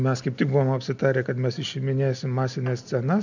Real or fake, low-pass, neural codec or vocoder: real; 7.2 kHz; none